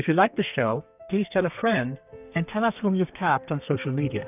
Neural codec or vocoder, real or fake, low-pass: codec, 32 kHz, 1.9 kbps, SNAC; fake; 3.6 kHz